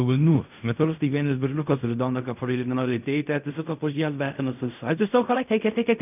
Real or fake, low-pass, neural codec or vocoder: fake; 3.6 kHz; codec, 16 kHz in and 24 kHz out, 0.4 kbps, LongCat-Audio-Codec, fine tuned four codebook decoder